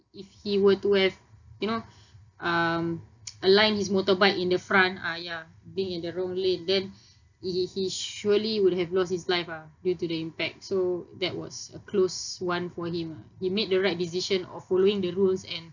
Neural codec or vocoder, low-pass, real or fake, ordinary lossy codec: none; 7.2 kHz; real; none